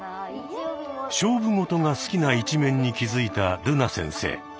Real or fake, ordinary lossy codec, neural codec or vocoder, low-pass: real; none; none; none